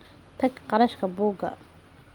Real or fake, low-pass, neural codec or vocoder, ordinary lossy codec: real; 19.8 kHz; none; Opus, 32 kbps